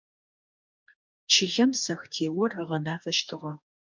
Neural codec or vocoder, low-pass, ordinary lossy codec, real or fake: codec, 44.1 kHz, 2.6 kbps, DAC; 7.2 kHz; MP3, 64 kbps; fake